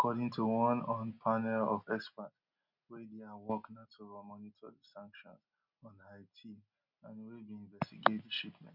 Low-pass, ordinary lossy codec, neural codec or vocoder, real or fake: 5.4 kHz; none; none; real